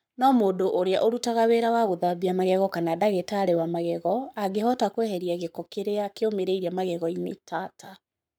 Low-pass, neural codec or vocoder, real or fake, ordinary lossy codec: none; codec, 44.1 kHz, 7.8 kbps, Pupu-Codec; fake; none